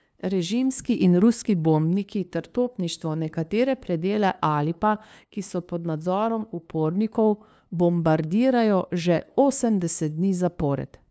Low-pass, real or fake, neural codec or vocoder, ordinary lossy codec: none; fake; codec, 16 kHz, 2 kbps, FunCodec, trained on LibriTTS, 25 frames a second; none